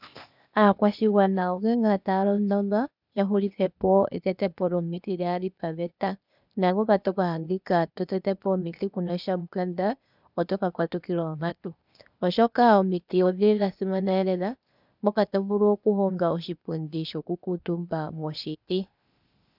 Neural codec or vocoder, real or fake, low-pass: codec, 16 kHz, 0.8 kbps, ZipCodec; fake; 5.4 kHz